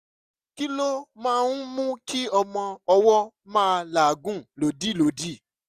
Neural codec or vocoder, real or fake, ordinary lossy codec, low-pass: none; real; none; 14.4 kHz